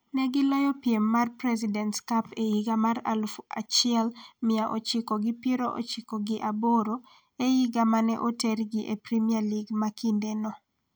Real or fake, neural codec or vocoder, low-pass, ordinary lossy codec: real; none; none; none